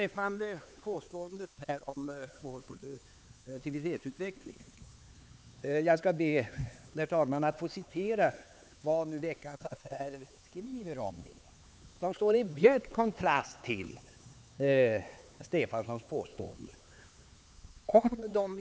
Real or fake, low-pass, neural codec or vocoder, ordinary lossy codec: fake; none; codec, 16 kHz, 4 kbps, X-Codec, HuBERT features, trained on LibriSpeech; none